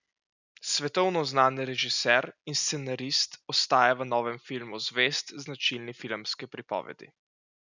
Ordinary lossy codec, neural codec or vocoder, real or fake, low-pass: none; none; real; 7.2 kHz